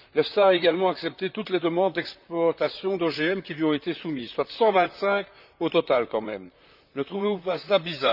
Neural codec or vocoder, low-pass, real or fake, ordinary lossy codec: vocoder, 44.1 kHz, 128 mel bands, Pupu-Vocoder; 5.4 kHz; fake; none